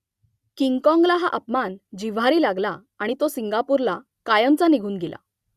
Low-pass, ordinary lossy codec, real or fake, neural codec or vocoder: 14.4 kHz; Opus, 64 kbps; real; none